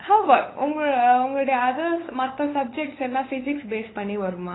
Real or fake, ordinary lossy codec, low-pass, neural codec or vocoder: fake; AAC, 16 kbps; 7.2 kHz; codec, 16 kHz, 8 kbps, FunCodec, trained on LibriTTS, 25 frames a second